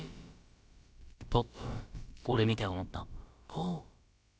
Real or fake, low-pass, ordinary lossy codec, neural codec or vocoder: fake; none; none; codec, 16 kHz, about 1 kbps, DyCAST, with the encoder's durations